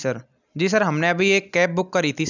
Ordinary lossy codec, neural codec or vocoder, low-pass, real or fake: none; none; 7.2 kHz; real